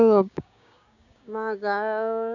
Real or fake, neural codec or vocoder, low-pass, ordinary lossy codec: fake; codec, 16 kHz, 6 kbps, DAC; 7.2 kHz; none